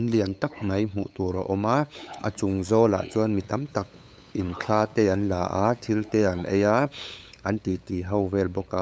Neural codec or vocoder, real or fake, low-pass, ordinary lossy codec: codec, 16 kHz, 8 kbps, FunCodec, trained on LibriTTS, 25 frames a second; fake; none; none